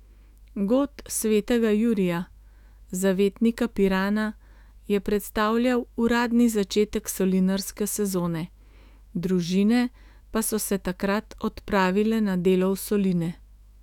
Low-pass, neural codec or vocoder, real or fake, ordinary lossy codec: 19.8 kHz; autoencoder, 48 kHz, 128 numbers a frame, DAC-VAE, trained on Japanese speech; fake; none